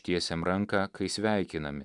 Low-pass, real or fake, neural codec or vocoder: 10.8 kHz; real; none